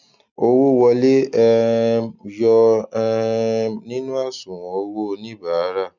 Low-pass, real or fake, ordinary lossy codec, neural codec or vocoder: 7.2 kHz; real; none; none